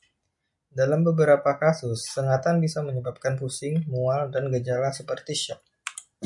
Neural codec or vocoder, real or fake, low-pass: none; real; 10.8 kHz